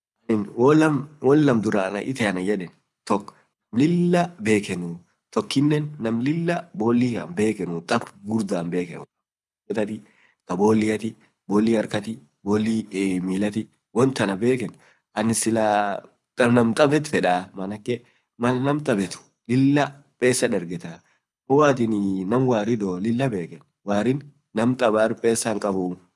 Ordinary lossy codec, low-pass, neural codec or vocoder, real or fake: none; none; codec, 24 kHz, 6 kbps, HILCodec; fake